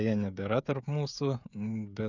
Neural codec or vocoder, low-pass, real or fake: codec, 16 kHz, 16 kbps, FreqCodec, smaller model; 7.2 kHz; fake